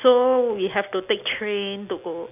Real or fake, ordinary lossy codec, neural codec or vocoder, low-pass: real; none; none; 3.6 kHz